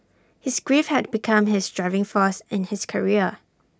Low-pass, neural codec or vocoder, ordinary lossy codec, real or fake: none; none; none; real